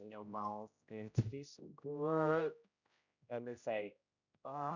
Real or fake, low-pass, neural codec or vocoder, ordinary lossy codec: fake; 7.2 kHz; codec, 16 kHz, 0.5 kbps, X-Codec, HuBERT features, trained on general audio; none